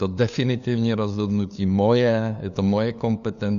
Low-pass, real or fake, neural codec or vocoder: 7.2 kHz; fake; codec, 16 kHz, 2 kbps, FunCodec, trained on LibriTTS, 25 frames a second